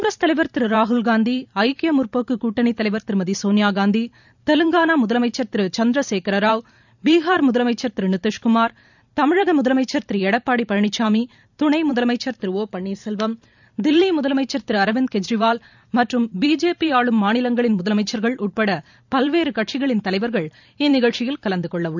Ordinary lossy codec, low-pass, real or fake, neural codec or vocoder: none; 7.2 kHz; fake; vocoder, 44.1 kHz, 128 mel bands every 512 samples, BigVGAN v2